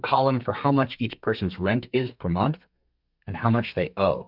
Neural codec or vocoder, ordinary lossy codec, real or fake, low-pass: codec, 32 kHz, 1.9 kbps, SNAC; MP3, 48 kbps; fake; 5.4 kHz